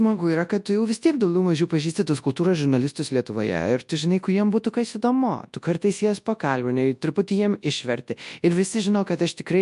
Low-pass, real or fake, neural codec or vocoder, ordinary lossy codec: 10.8 kHz; fake; codec, 24 kHz, 0.9 kbps, WavTokenizer, large speech release; MP3, 64 kbps